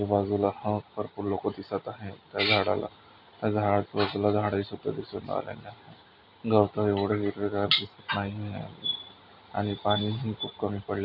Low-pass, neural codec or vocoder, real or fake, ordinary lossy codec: 5.4 kHz; none; real; none